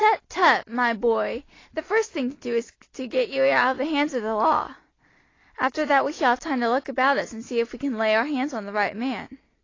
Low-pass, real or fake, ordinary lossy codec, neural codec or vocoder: 7.2 kHz; real; AAC, 32 kbps; none